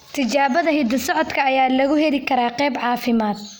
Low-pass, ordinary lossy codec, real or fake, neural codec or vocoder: none; none; real; none